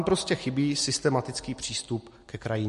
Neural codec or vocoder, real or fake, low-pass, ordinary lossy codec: none; real; 14.4 kHz; MP3, 48 kbps